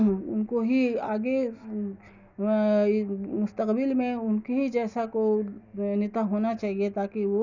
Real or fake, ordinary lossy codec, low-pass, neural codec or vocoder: real; none; 7.2 kHz; none